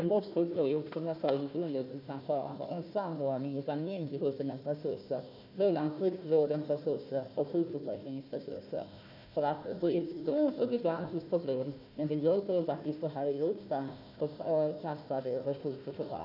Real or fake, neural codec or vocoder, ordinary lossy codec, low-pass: fake; codec, 16 kHz, 1 kbps, FunCodec, trained on Chinese and English, 50 frames a second; none; 5.4 kHz